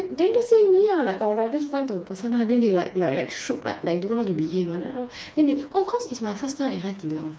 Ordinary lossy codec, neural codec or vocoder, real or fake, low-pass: none; codec, 16 kHz, 2 kbps, FreqCodec, smaller model; fake; none